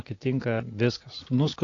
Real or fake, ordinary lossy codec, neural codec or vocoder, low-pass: real; AAC, 32 kbps; none; 7.2 kHz